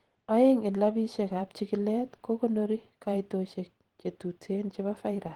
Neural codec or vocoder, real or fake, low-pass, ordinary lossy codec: vocoder, 44.1 kHz, 128 mel bands every 512 samples, BigVGAN v2; fake; 19.8 kHz; Opus, 16 kbps